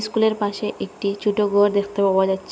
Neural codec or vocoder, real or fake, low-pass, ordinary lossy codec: none; real; none; none